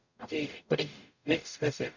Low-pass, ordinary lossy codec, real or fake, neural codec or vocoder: 7.2 kHz; none; fake; codec, 44.1 kHz, 0.9 kbps, DAC